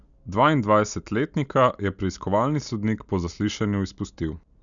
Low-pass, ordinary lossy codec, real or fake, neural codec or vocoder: 7.2 kHz; none; real; none